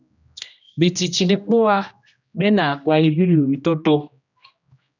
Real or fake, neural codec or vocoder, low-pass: fake; codec, 16 kHz, 1 kbps, X-Codec, HuBERT features, trained on general audio; 7.2 kHz